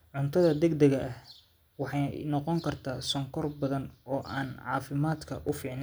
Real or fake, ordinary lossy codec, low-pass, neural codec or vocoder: real; none; none; none